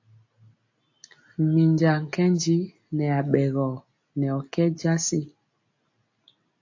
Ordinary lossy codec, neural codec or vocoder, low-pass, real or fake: AAC, 48 kbps; none; 7.2 kHz; real